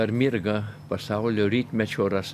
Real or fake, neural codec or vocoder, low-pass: real; none; 14.4 kHz